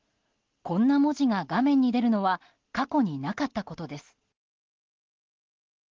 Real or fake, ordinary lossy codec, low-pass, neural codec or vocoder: real; Opus, 16 kbps; 7.2 kHz; none